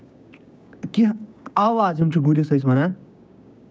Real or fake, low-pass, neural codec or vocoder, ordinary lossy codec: fake; none; codec, 16 kHz, 6 kbps, DAC; none